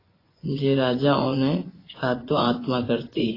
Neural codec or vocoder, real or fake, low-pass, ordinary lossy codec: vocoder, 24 kHz, 100 mel bands, Vocos; fake; 5.4 kHz; AAC, 24 kbps